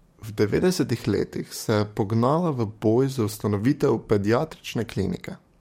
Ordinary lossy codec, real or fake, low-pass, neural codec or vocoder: MP3, 64 kbps; fake; 19.8 kHz; codec, 44.1 kHz, 7.8 kbps, DAC